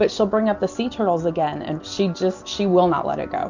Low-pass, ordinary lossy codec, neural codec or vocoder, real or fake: 7.2 kHz; Opus, 64 kbps; none; real